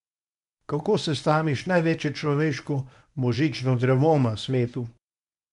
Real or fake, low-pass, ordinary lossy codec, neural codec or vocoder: fake; 10.8 kHz; none; codec, 24 kHz, 0.9 kbps, WavTokenizer, medium speech release version 1